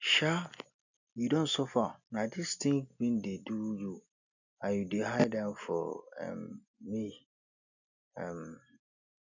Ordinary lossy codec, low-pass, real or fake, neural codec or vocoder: none; 7.2 kHz; real; none